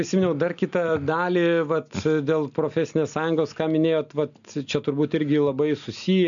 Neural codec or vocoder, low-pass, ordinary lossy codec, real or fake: none; 7.2 kHz; MP3, 96 kbps; real